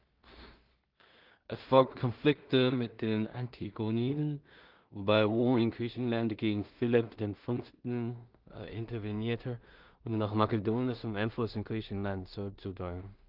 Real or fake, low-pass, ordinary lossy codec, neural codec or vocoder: fake; 5.4 kHz; Opus, 32 kbps; codec, 16 kHz in and 24 kHz out, 0.4 kbps, LongCat-Audio-Codec, two codebook decoder